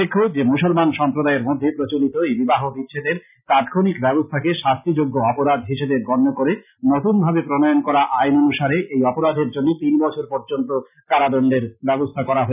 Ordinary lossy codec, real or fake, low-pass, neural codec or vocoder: none; real; 3.6 kHz; none